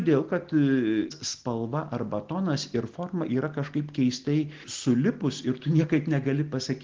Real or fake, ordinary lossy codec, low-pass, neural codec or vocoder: real; Opus, 16 kbps; 7.2 kHz; none